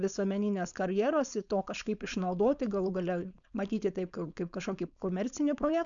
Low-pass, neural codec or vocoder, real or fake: 7.2 kHz; codec, 16 kHz, 4.8 kbps, FACodec; fake